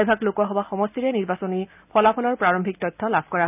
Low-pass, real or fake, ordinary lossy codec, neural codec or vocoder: 3.6 kHz; real; none; none